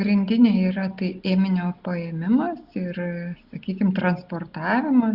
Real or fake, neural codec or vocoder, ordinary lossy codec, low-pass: real; none; Opus, 64 kbps; 5.4 kHz